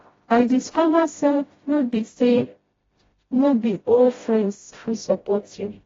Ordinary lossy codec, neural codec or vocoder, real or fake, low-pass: AAC, 24 kbps; codec, 16 kHz, 0.5 kbps, FreqCodec, smaller model; fake; 7.2 kHz